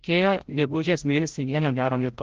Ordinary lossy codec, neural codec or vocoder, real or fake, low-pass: Opus, 16 kbps; codec, 16 kHz, 0.5 kbps, FreqCodec, larger model; fake; 7.2 kHz